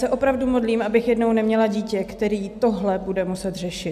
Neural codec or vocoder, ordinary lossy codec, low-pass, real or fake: none; AAC, 96 kbps; 14.4 kHz; real